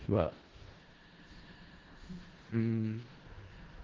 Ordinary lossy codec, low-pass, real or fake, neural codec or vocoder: Opus, 16 kbps; 7.2 kHz; fake; codec, 16 kHz in and 24 kHz out, 0.4 kbps, LongCat-Audio-Codec, four codebook decoder